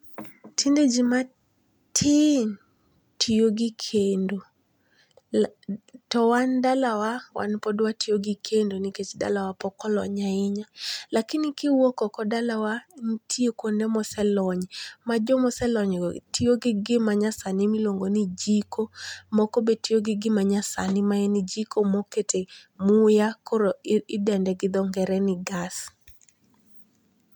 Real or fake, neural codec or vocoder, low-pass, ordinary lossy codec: real; none; 19.8 kHz; none